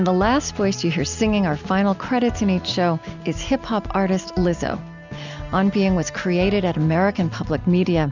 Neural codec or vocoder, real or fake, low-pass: none; real; 7.2 kHz